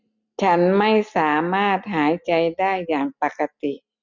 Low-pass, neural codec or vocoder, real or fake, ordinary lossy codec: 7.2 kHz; none; real; none